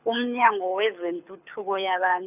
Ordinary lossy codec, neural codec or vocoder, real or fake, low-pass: none; codec, 24 kHz, 6 kbps, HILCodec; fake; 3.6 kHz